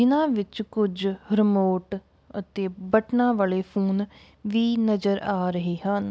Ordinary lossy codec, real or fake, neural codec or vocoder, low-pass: none; real; none; none